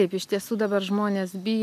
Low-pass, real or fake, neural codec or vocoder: 14.4 kHz; fake; vocoder, 44.1 kHz, 128 mel bands every 256 samples, BigVGAN v2